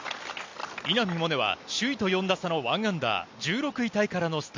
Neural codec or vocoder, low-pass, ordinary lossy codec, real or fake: none; 7.2 kHz; MP3, 64 kbps; real